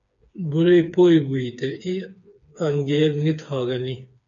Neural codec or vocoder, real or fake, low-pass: codec, 16 kHz, 4 kbps, FreqCodec, smaller model; fake; 7.2 kHz